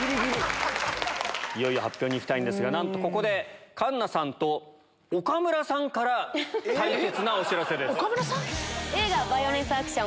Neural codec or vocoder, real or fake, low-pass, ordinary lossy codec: none; real; none; none